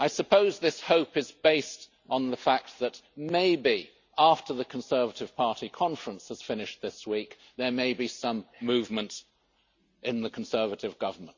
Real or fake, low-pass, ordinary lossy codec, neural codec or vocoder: real; 7.2 kHz; Opus, 64 kbps; none